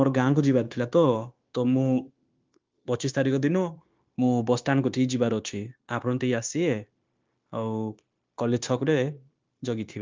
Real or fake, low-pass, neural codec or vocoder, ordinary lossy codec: fake; 7.2 kHz; codec, 16 kHz, 0.9 kbps, LongCat-Audio-Codec; Opus, 24 kbps